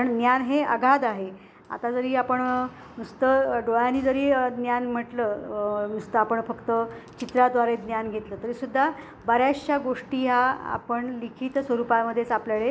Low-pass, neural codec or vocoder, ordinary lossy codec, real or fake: none; none; none; real